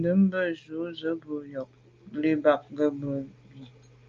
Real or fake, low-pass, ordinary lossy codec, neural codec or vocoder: real; 7.2 kHz; Opus, 24 kbps; none